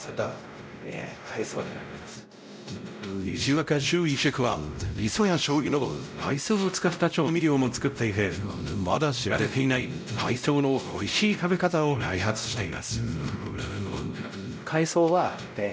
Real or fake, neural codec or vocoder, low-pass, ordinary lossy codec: fake; codec, 16 kHz, 0.5 kbps, X-Codec, WavLM features, trained on Multilingual LibriSpeech; none; none